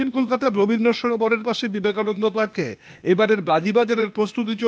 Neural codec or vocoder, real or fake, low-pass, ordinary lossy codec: codec, 16 kHz, 0.8 kbps, ZipCodec; fake; none; none